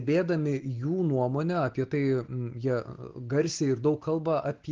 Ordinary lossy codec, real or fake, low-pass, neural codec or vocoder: Opus, 16 kbps; real; 7.2 kHz; none